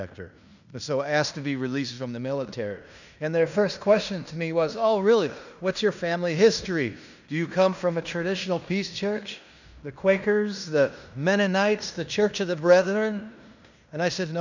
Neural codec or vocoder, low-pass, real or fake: codec, 16 kHz in and 24 kHz out, 0.9 kbps, LongCat-Audio-Codec, fine tuned four codebook decoder; 7.2 kHz; fake